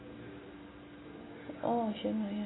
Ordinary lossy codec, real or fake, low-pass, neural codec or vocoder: AAC, 16 kbps; real; 7.2 kHz; none